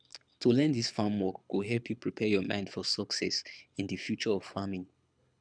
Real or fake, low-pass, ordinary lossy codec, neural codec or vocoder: fake; 9.9 kHz; none; codec, 24 kHz, 6 kbps, HILCodec